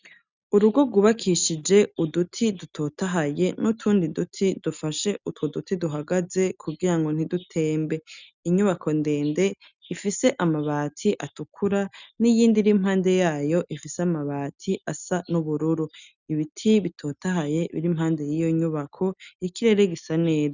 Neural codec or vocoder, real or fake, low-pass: none; real; 7.2 kHz